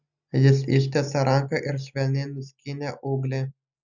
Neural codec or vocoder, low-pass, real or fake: none; 7.2 kHz; real